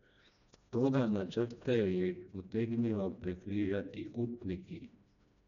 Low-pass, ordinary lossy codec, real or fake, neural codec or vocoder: 7.2 kHz; none; fake; codec, 16 kHz, 1 kbps, FreqCodec, smaller model